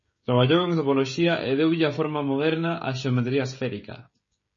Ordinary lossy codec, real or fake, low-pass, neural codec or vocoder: MP3, 32 kbps; fake; 7.2 kHz; codec, 16 kHz, 16 kbps, FreqCodec, smaller model